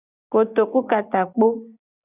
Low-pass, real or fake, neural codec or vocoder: 3.6 kHz; real; none